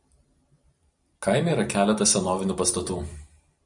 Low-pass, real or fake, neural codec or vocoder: 10.8 kHz; fake; vocoder, 44.1 kHz, 128 mel bands every 512 samples, BigVGAN v2